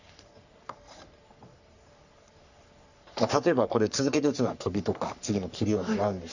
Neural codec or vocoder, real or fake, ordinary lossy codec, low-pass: codec, 44.1 kHz, 3.4 kbps, Pupu-Codec; fake; none; 7.2 kHz